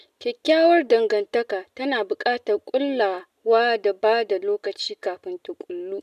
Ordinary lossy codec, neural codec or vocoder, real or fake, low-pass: none; none; real; 14.4 kHz